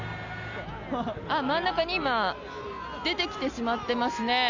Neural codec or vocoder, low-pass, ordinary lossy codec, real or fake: none; 7.2 kHz; none; real